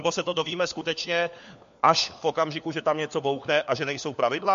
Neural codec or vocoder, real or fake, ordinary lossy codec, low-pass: codec, 16 kHz, 4 kbps, FunCodec, trained on LibriTTS, 50 frames a second; fake; MP3, 48 kbps; 7.2 kHz